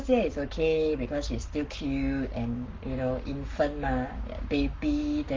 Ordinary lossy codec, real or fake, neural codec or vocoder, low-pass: Opus, 32 kbps; fake; codec, 44.1 kHz, 7.8 kbps, Pupu-Codec; 7.2 kHz